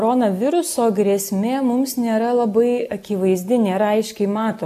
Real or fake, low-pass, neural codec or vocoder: real; 14.4 kHz; none